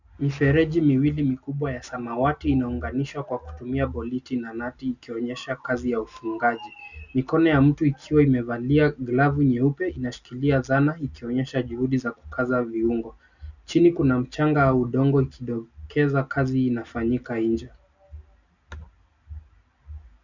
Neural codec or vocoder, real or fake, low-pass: none; real; 7.2 kHz